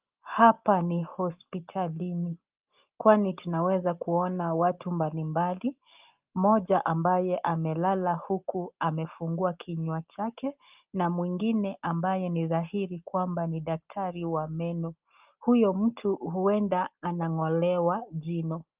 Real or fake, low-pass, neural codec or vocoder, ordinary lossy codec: real; 3.6 kHz; none; Opus, 32 kbps